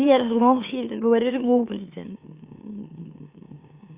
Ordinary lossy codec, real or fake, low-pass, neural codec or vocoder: Opus, 64 kbps; fake; 3.6 kHz; autoencoder, 44.1 kHz, a latent of 192 numbers a frame, MeloTTS